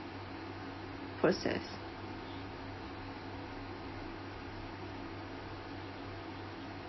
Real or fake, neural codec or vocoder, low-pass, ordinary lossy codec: real; none; 7.2 kHz; MP3, 24 kbps